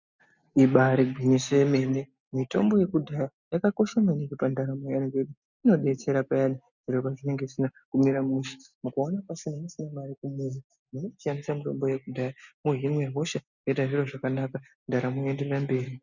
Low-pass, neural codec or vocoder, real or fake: 7.2 kHz; none; real